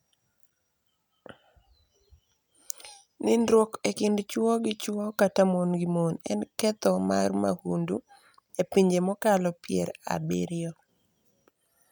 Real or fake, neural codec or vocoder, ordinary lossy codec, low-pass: real; none; none; none